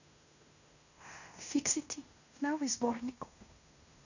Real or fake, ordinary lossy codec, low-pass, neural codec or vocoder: fake; none; 7.2 kHz; codec, 16 kHz in and 24 kHz out, 0.9 kbps, LongCat-Audio-Codec, fine tuned four codebook decoder